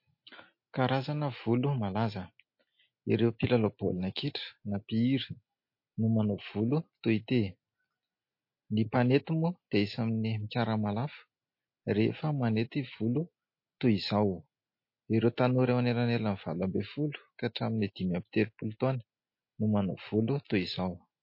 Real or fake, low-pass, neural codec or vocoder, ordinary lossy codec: real; 5.4 kHz; none; MP3, 32 kbps